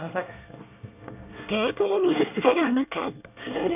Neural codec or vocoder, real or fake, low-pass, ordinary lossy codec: codec, 24 kHz, 1 kbps, SNAC; fake; 3.6 kHz; none